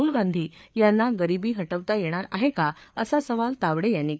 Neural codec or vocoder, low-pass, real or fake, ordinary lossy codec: codec, 16 kHz, 4 kbps, FreqCodec, larger model; none; fake; none